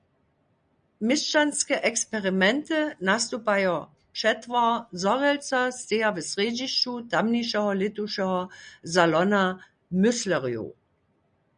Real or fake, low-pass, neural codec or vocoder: real; 9.9 kHz; none